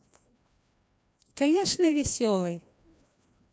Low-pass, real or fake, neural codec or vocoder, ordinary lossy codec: none; fake; codec, 16 kHz, 1 kbps, FreqCodec, larger model; none